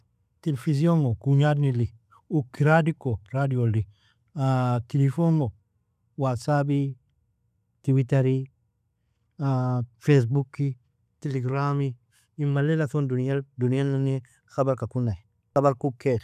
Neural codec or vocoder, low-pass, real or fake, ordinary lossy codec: none; 14.4 kHz; real; none